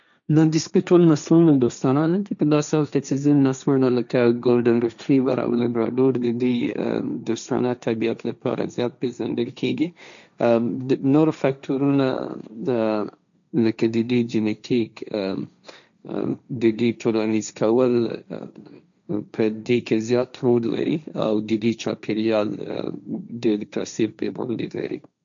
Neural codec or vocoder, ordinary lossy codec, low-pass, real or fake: codec, 16 kHz, 1.1 kbps, Voila-Tokenizer; none; 7.2 kHz; fake